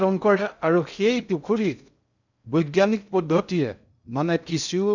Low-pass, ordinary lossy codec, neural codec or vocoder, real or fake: 7.2 kHz; none; codec, 16 kHz in and 24 kHz out, 0.6 kbps, FocalCodec, streaming, 2048 codes; fake